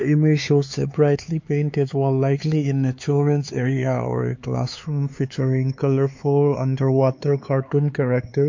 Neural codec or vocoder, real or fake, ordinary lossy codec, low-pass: codec, 16 kHz, 4 kbps, X-Codec, HuBERT features, trained on LibriSpeech; fake; MP3, 48 kbps; 7.2 kHz